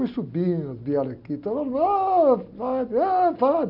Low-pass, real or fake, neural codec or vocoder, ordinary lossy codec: 5.4 kHz; real; none; none